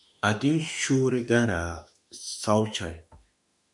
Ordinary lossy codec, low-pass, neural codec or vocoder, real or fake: MP3, 96 kbps; 10.8 kHz; autoencoder, 48 kHz, 32 numbers a frame, DAC-VAE, trained on Japanese speech; fake